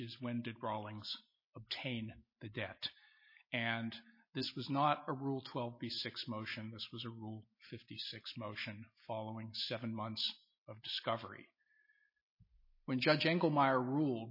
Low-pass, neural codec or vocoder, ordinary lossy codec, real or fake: 5.4 kHz; none; MP3, 24 kbps; real